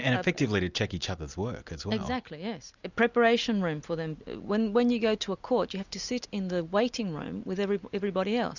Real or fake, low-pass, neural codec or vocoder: real; 7.2 kHz; none